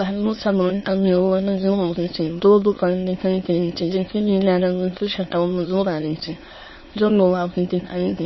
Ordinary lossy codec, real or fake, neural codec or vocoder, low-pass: MP3, 24 kbps; fake; autoencoder, 22.05 kHz, a latent of 192 numbers a frame, VITS, trained on many speakers; 7.2 kHz